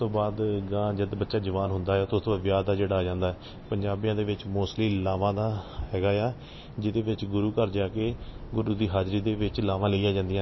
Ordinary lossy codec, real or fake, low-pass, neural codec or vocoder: MP3, 24 kbps; real; 7.2 kHz; none